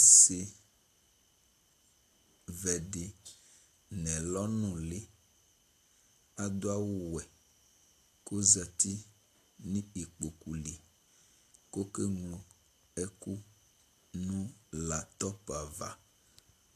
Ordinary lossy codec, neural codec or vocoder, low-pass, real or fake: AAC, 64 kbps; none; 14.4 kHz; real